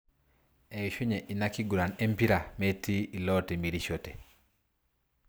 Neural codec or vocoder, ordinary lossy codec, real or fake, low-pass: none; none; real; none